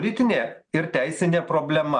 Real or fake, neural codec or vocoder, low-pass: real; none; 9.9 kHz